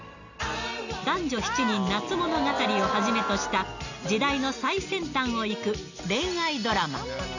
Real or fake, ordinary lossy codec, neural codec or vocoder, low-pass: real; none; none; 7.2 kHz